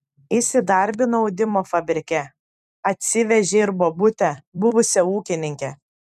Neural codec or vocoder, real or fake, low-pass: vocoder, 44.1 kHz, 128 mel bands every 256 samples, BigVGAN v2; fake; 14.4 kHz